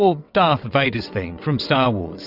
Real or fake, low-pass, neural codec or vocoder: fake; 5.4 kHz; vocoder, 22.05 kHz, 80 mel bands, WaveNeXt